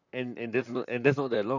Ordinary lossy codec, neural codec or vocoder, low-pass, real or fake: none; vocoder, 44.1 kHz, 128 mel bands, Pupu-Vocoder; 7.2 kHz; fake